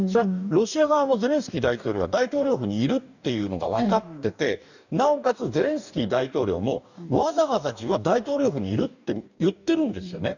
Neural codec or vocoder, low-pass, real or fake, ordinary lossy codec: codec, 44.1 kHz, 2.6 kbps, DAC; 7.2 kHz; fake; none